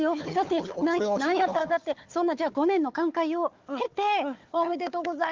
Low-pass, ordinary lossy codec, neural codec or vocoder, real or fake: 7.2 kHz; Opus, 32 kbps; codec, 16 kHz, 4 kbps, FunCodec, trained on Chinese and English, 50 frames a second; fake